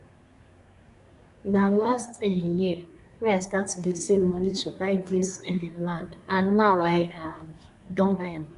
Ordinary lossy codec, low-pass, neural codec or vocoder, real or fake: none; 10.8 kHz; codec, 24 kHz, 1 kbps, SNAC; fake